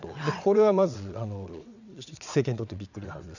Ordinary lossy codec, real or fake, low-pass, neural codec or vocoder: none; fake; 7.2 kHz; vocoder, 22.05 kHz, 80 mel bands, WaveNeXt